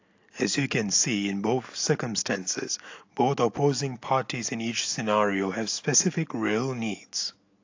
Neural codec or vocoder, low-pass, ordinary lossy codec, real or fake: none; 7.2 kHz; AAC, 48 kbps; real